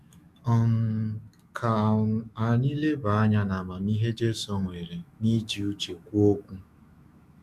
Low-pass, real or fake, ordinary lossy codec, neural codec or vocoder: 14.4 kHz; fake; Opus, 64 kbps; autoencoder, 48 kHz, 128 numbers a frame, DAC-VAE, trained on Japanese speech